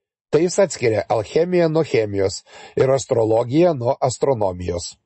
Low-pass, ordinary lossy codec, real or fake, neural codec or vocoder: 10.8 kHz; MP3, 32 kbps; real; none